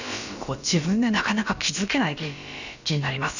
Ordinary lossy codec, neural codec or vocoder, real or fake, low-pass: none; codec, 16 kHz, about 1 kbps, DyCAST, with the encoder's durations; fake; 7.2 kHz